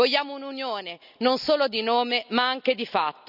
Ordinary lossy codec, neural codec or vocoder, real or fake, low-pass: none; none; real; 5.4 kHz